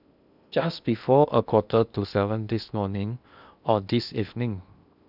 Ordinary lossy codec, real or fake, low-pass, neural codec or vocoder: none; fake; 5.4 kHz; codec, 16 kHz in and 24 kHz out, 0.8 kbps, FocalCodec, streaming, 65536 codes